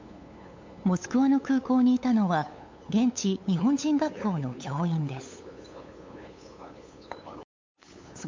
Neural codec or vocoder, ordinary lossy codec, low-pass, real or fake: codec, 16 kHz, 8 kbps, FunCodec, trained on LibriTTS, 25 frames a second; MP3, 48 kbps; 7.2 kHz; fake